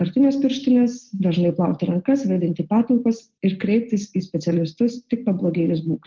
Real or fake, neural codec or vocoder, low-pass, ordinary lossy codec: real; none; 7.2 kHz; Opus, 16 kbps